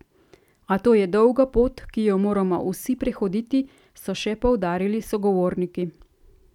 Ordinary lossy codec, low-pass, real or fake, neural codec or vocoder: none; 19.8 kHz; real; none